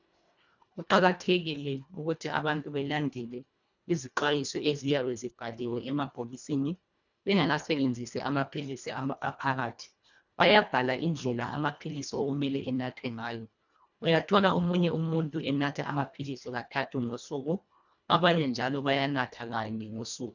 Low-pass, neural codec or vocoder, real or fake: 7.2 kHz; codec, 24 kHz, 1.5 kbps, HILCodec; fake